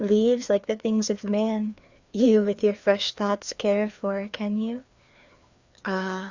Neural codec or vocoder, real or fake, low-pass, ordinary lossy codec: codec, 16 kHz, 2 kbps, FreqCodec, larger model; fake; 7.2 kHz; Opus, 64 kbps